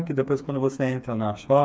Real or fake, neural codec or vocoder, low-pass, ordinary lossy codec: fake; codec, 16 kHz, 4 kbps, FreqCodec, smaller model; none; none